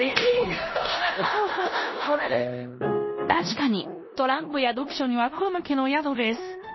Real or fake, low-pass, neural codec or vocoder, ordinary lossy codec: fake; 7.2 kHz; codec, 16 kHz in and 24 kHz out, 0.9 kbps, LongCat-Audio-Codec, four codebook decoder; MP3, 24 kbps